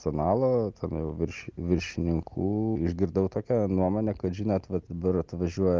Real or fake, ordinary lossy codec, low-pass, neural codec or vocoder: real; Opus, 24 kbps; 7.2 kHz; none